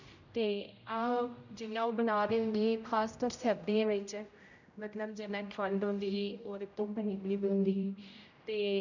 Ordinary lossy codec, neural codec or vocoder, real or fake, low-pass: none; codec, 16 kHz, 0.5 kbps, X-Codec, HuBERT features, trained on general audio; fake; 7.2 kHz